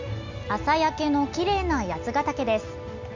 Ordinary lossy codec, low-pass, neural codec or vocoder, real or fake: MP3, 64 kbps; 7.2 kHz; none; real